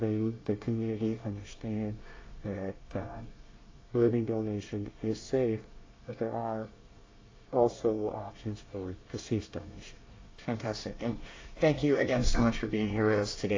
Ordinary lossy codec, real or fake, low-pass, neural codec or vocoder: AAC, 32 kbps; fake; 7.2 kHz; codec, 24 kHz, 1 kbps, SNAC